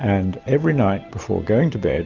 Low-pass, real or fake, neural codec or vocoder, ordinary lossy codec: 7.2 kHz; real; none; Opus, 24 kbps